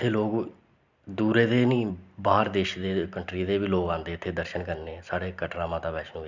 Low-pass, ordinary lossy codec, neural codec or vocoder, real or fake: 7.2 kHz; none; none; real